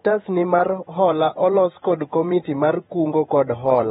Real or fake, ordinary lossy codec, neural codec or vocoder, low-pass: fake; AAC, 16 kbps; vocoder, 48 kHz, 128 mel bands, Vocos; 19.8 kHz